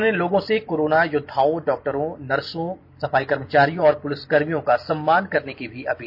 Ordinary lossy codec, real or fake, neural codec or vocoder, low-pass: none; real; none; 5.4 kHz